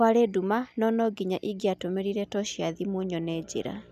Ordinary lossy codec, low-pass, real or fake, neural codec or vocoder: none; 14.4 kHz; real; none